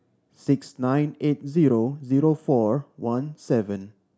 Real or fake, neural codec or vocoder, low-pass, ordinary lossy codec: real; none; none; none